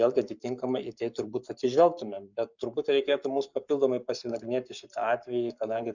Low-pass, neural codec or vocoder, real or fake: 7.2 kHz; codec, 44.1 kHz, 7.8 kbps, Pupu-Codec; fake